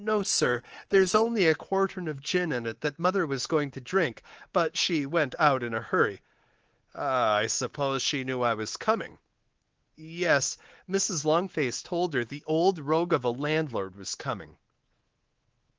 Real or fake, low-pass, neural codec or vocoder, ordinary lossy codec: fake; 7.2 kHz; codec, 24 kHz, 3.1 kbps, DualCodec; Opus, 16 kbps